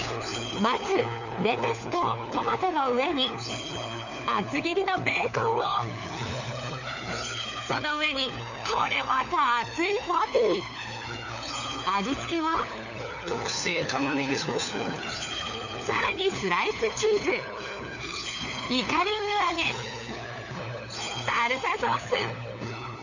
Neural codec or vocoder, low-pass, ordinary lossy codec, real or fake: codec, 16 kHz, 4 kbps, FunCodec, trained on LibriTTS, 50 frames a second; 7.2 kHz; none; fake